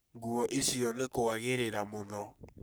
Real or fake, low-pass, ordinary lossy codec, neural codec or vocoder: fake; none; none; codec, 44.1 kHz, 3.4 kbps, Pupu-Codec